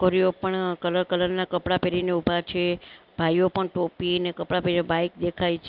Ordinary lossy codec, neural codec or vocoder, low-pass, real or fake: Opus, 24 kbps; none; 5.4 kHz; real